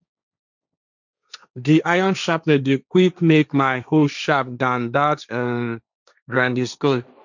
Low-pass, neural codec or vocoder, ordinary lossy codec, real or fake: 7.2 kHz; codec, 16 kHz, 1.1 kbps, Voila-Tokenizer; none; fake